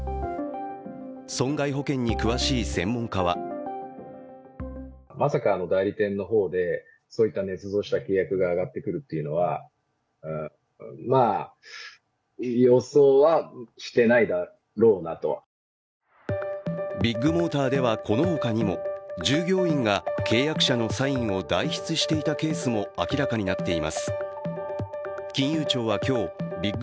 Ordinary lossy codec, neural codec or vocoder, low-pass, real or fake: none; none; none; real